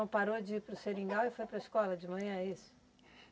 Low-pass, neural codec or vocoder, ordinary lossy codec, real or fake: none; none; none; real